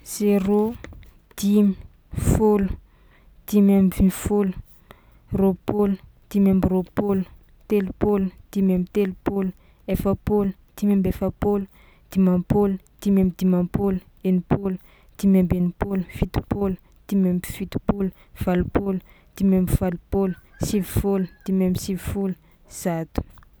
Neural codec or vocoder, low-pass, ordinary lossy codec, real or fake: none; none; none; real